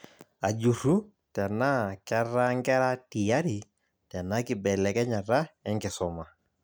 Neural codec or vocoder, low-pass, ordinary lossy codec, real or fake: none; none; none; real